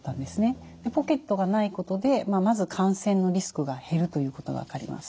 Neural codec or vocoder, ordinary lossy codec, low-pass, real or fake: none; none; none; real